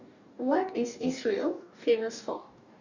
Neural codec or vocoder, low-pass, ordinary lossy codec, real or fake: codec, 44.1 kHz, 2.6 kbps, DAC; 7.2 kHz; none; fake